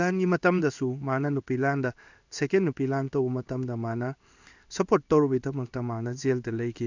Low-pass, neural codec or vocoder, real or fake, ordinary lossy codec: 7.2 kHz; codec, 16 kHz in and 24 kHz out, 1 kbps, XY-Tokenizer; fake; none